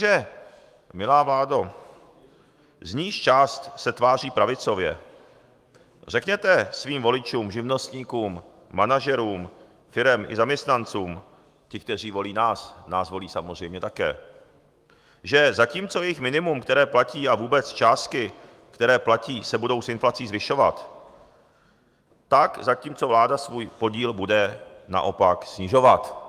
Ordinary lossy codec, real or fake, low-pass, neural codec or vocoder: Opus, 32 kbps; fake; 14.4 kHz; autoencoder, 48 kHz, 128 numbers a frame, DAC-VAE, trained on Japanese speech